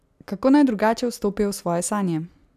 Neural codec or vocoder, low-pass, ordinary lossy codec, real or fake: none; 14.4 kHz; none; real